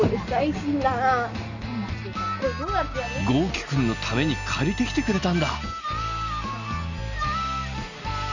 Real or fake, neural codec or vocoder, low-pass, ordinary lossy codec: real; none; 7.2 kHz; none